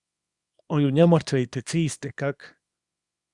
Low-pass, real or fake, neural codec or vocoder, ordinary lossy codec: 10.8 kHz; fake; codec, 24 kHz, 0.9 kbps, WavTokenizer, small release; Opus, 64 kbps